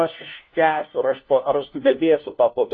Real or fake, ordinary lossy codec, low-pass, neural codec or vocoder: fake; AAC, 32 kbps; 7.2 kHz; codec, 16 kHz, 1 kbps, FunCodec, trained on LibriTTS, 50 frames a second